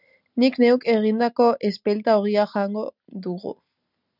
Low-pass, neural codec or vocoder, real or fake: 5.4 kHz; none; real